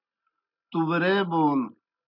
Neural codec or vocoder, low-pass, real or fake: none; 5.4 kHz; real